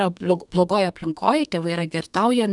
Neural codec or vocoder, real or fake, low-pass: codec, 32 kHz, 1.9 kbps, SNAC; fake; 10.8 kHz